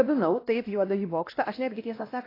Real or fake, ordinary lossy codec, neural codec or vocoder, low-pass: fake; AAC, 24 kbps; codec, 16 kHz, 1 kbps, X-Codec, WavLM features, trained on Multilingual LibriSpeech; 5.4 kHz